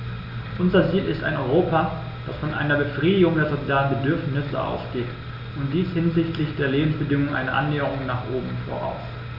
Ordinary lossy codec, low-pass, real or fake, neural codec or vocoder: none; 5.4 kHz; real; none